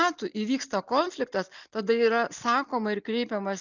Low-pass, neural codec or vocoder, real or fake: 7.2 kHz; none; real